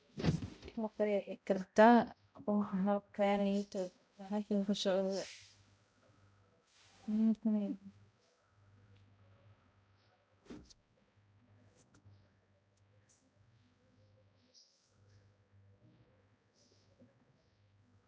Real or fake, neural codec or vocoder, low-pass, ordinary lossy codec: fake; codec, 16 kHz, 0.5 kbps, X-Codec, HuBERT features, trained on balanced general audio; none; none